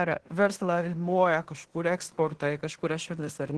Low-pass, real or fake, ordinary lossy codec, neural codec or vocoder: 10.8 kHz; fake; Opus, 16 kbps; codec, 16 kHz in and 24 kHz out, 0.9 kbps, LongCat-Audio-Codec, fine tuned four codebook decoder